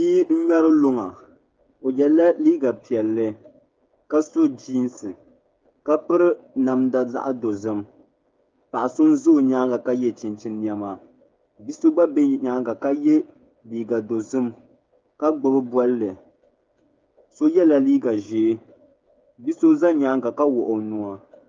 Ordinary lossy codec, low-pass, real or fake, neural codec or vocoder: Opus, 24 kbps; 7.2 kHz; fake; codec, 16 kHz, 8 kbps, FreqCodec, smaller model